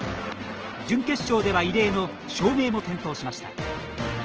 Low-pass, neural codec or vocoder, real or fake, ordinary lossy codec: 7.2 kHz; none; real; Opus, 16 kbps